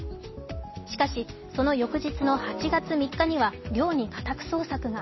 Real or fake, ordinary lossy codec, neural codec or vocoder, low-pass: real; MP3, 24 kbps; none; 7.2 kHz